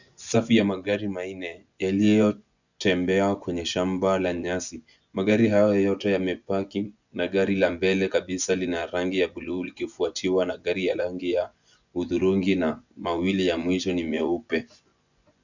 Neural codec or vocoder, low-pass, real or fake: vocoder, 24 kHz, 100 mel bands, Vocos; 7.2 kHz; fake